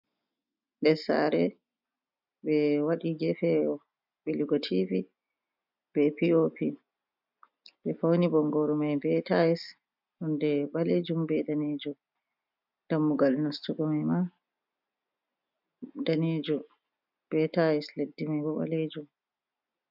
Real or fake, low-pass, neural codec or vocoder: real; 5.4 kHz; none